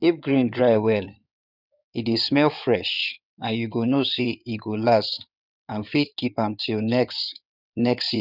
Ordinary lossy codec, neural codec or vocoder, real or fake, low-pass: none; codec, 16 kHz in and 24 kHz out, 2.2 kbps, FireRedTTS-2 codec; fake; 5.4 kHz